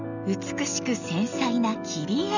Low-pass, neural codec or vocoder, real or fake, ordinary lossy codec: 7.2 kHz; none; real; none